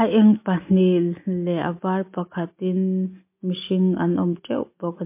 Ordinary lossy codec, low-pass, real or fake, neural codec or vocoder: MP3, 24 kbps; 3.6 kHz; real; none